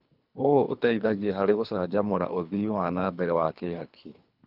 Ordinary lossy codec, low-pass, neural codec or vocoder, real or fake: none; 5.4 kHz; codec, 24 kHz, 3 kbps, HILCodec; fake